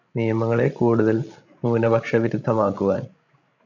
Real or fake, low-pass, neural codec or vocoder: fake; 7.2 kHz; codec, 16 kHz, 16 kbps, FreqCodec, larger model